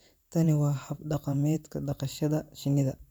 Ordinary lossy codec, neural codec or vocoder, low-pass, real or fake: none; vocoder, 44.1 kHz, 128 mel bands every 512 samples, BigVGAN v2; none; fake